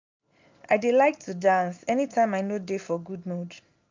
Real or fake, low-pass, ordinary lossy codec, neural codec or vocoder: real; 7.2 kHz; MP3, 64 kbps; none